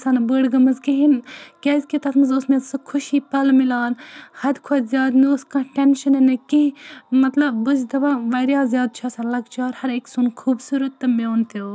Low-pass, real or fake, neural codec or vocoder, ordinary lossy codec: none; real; none; none